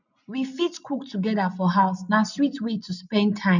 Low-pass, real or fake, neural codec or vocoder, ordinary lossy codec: 7.2 kHz; real; none; none